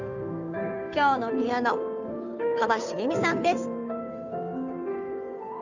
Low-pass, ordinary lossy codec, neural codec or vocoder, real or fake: 7.2 kHz; none; codec, 16 kHz, 2 kbps, FunCodec, trained on Chinese and English, 25 frames a second; fake